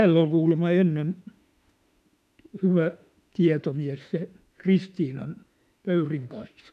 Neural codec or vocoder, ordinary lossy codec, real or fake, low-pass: autoencoder, 48 kHz, 32 numbers a frame, DAC-VAE, trained on Japanese speech; none; fake; 14.4 kHz